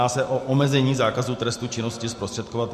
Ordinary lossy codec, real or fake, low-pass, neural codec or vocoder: MP3, 64 kbps; real; 14.4 kHz; none